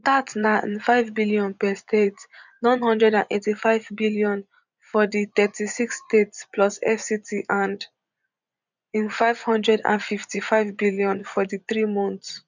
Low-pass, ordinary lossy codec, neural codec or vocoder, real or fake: 7.2 kHz; none; vocoder, 24 kHz, 100 mel bands, Vocos; fake